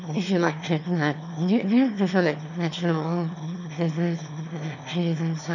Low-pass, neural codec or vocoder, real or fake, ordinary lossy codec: 7.2 kHz; autoencoder, 22.05 kHz, a latent of 192 numbers a frame, VITS, trained on one speaker; fake; none